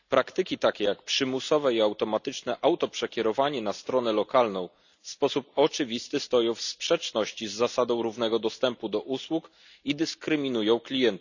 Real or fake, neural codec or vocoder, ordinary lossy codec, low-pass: real; none; none; 7.2 kHz